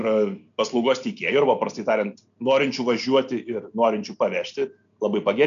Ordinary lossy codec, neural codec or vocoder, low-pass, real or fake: AAC, 96 kbps; none; 7.2 kHz; real